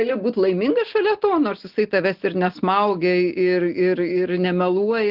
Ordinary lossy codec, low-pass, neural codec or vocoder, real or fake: Opus, 16 kbps; 5.4 kHz; none; real